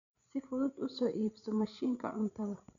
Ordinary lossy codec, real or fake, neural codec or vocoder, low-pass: none; fake; codec, 16 kHz, 16 kbps, FreqCodec, larger model; 7.2 kHz